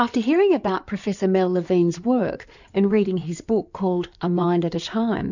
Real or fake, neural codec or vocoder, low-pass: fake; codec, 16 kHz in and 24 kHz out, 2.2 kbps, FireRedTTS-2 codec; 7.2 kHz